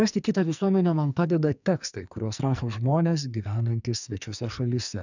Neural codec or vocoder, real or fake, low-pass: codec, 44.1 kHz, 2.6 kbps, SNAC; fake; 7.2 kHz